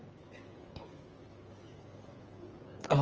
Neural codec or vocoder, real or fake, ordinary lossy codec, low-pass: codec, 16 kHz, 8 kbps, FreqCodec, smaller model; fake; Opus, 24 kbps; 7.2 kHz